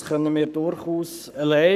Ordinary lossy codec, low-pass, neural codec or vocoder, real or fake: none; 14.4 kHz; codec, 44.1 kHz, 7.8 kbps, Pupu-Codec; fake